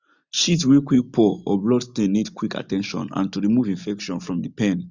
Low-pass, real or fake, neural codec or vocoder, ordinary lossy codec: 7.2 kHz; real; none; none